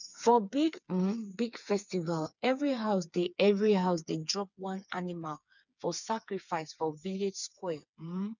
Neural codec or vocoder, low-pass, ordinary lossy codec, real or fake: codec, 16 kHz, 4 kbps, FreqCodec, smaller model; 7.2 kHz; none; fake